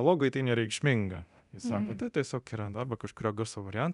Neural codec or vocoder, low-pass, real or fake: codec, 24 kHz, 0.9 kbps, DualCodec; 10.8 kHz; fake